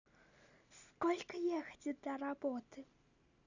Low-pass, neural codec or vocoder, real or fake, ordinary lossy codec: 7.2 kHz; none; real; none